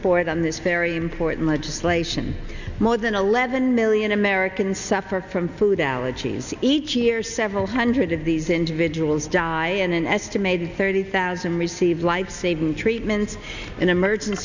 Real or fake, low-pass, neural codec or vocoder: real; 7.2 kHz; none